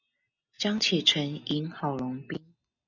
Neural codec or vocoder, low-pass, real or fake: none; 7.2 kHz; real